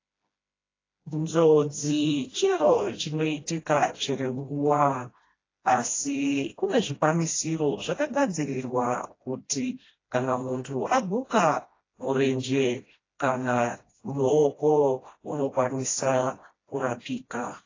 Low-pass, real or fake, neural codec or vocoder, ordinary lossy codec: 7.2 kHz; fake; codec, 16 kHz, 1 kbps, FreqCodec, smaller model; AAC, 32 kbps